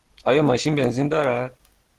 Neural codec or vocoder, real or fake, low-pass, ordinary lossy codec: none; real; 14.4 kHz; Opus, 16 kbps